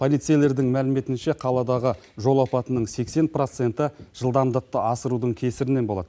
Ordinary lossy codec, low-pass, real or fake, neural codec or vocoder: none; none; real; none